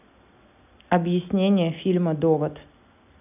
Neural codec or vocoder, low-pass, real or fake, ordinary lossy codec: none; 3.6 kHz; real; none